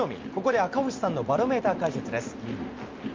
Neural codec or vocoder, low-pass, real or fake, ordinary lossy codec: none; 7.2 kHz; real; Opus, 32 kbps